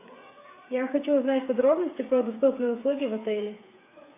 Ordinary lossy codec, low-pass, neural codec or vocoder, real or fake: AAC, 24 kbps; 3.6 kHz; codec, 16 kHz, 8 kbps, FreqCodec, smaller model; fake